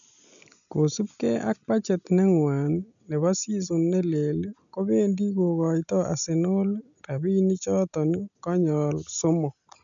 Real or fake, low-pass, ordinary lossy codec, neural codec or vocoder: real; 7.2 kHz; none; none